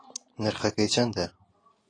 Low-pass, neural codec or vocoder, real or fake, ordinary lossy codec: 9.9 kHz; none; real; AAC, 32 kbps